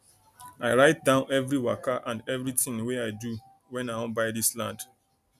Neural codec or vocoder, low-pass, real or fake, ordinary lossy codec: none; 14.4 kHz; real; none